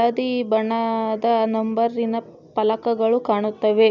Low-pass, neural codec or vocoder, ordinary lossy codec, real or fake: 7.2 kHz; none; none; real